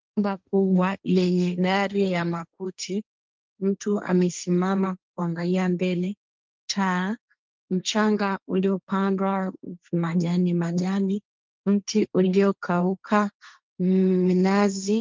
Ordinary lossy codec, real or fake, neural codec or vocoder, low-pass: Opus, 24 kbps; fake; codec, 16 kHz, 1.1 kbps, Voila-Tokenizer; 7.2 kHz